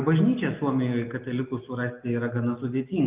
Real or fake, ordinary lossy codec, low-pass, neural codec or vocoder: real; Opus, 32 kbps; 3.6 kHz; none